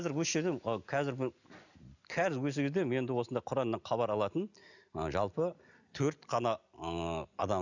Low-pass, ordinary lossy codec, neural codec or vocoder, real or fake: 7.2 kHz; none; none; real